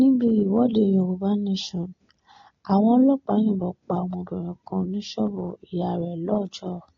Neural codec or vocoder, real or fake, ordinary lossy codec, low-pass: none; real; AAC, 32 kbps; 7.2 kHz